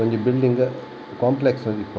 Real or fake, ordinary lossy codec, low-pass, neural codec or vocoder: real; none; none; none